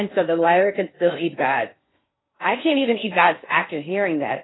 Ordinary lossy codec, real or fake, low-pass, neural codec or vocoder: AAC, 16 kbps; fake; 7.2 kHz; codec, 16 kHz in and 24 kHz out, 0.6 kbps, FocalCodec, streaming, 4096 codes